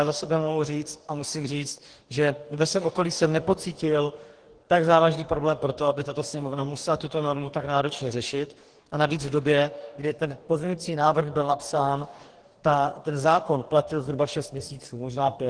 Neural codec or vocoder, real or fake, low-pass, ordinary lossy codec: codec, 44.1 kHz, 2.6 kbps, DAC; fake; 9.9 kHz; Opus, 16 kbps